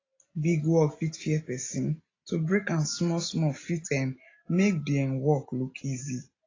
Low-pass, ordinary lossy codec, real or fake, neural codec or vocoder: 7.2 kHz; AAC, 32 kbps; real; none